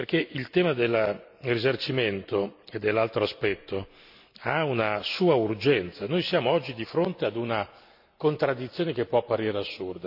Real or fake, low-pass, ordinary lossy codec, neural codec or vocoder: real; 5.4 kHz; none; none